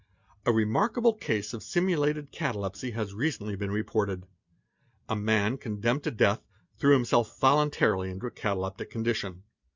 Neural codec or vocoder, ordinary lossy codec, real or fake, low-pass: none; Opus, 64 kbps; real; 7.2 kHz